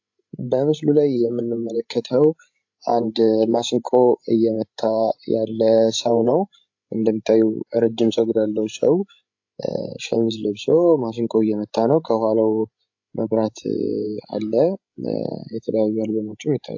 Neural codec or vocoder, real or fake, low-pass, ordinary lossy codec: codec, 16 kHz, 16 kbps, FreqCodec, larger model; fake; 7.2 kHz; AAC, 48 kbps